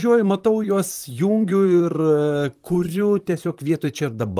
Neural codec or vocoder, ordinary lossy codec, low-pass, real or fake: vocoder, 44.1 kHz, 128 mel bands, Pupu-Vocoder; Opus, 32 kbps; 14.4 kHz; fake